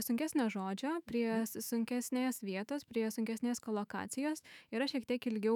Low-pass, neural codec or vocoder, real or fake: 19.8 kHz; autoencoder, 48 kHz, 128 numbers a frame, DAC-VAE, trained on Japanese speech; fake